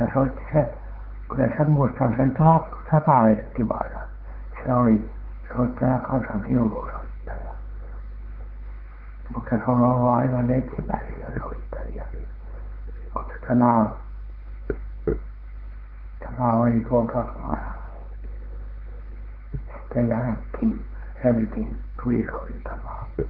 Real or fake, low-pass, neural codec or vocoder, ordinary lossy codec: fake; 5.4 kHz; codec, 16 kHz, 4 kbps, X-Codec, WavLM features, trained on Multilingual LibriSpeech; Opus, 16 kbps